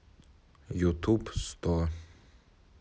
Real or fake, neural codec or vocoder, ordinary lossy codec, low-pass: real; none; none; none